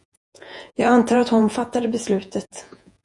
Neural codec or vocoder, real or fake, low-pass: vocoder, 48 kHz, 128 mel bands, Vocos; fake; 10.8 kHz